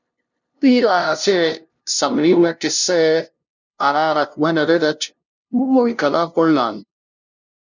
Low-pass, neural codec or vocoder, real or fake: 7.2 kHz; codec, 16 kHz, 0.5 kbps, FunCodec, trained on LibriTTS, 25 frames a second; fake